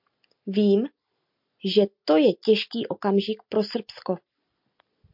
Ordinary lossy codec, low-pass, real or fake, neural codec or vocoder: MP3, 48 kbps; 5.4 kHz; real; none